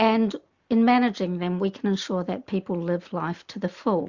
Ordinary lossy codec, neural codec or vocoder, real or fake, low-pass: Opus, 64 kbps; none; real; 7.2 kHz